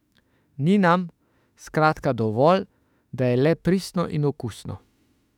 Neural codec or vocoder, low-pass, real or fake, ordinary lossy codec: autoencoder, 48 kHz, 32 numbers a frame, DAC-VAE, trained on Japanese speech; 19.8 kHz; fake; none